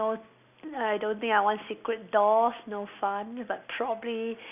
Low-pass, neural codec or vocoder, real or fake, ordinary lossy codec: 3.6 kHz; none; real; none